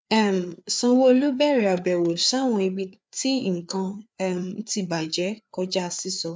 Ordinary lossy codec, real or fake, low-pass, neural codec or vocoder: none; fake; none; codec, 16 kHz, 4 kbps, FreqCodec, larger model